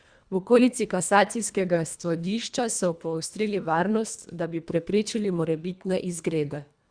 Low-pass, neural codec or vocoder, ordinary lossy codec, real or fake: 9.9 kHz; codec, 24 kHz, 1.5 kbps, HILCodec; Opus, 64 kbps; fake